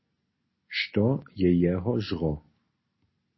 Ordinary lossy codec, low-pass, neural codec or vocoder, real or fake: MP3, 24 kbps; 7.2 kHz; none; real